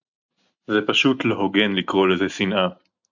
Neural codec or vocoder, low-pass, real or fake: none; 7.2 kHz; real